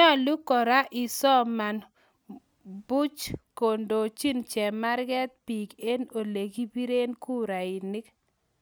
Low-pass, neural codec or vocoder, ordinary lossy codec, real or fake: none; none; none; real